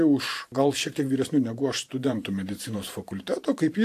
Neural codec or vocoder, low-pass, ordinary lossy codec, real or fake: vocoder, 44.1 kHz, 128 mel bands every 512 samples, BigVGAN v2; 14.4 kHz; AAC, 64 kbps; fake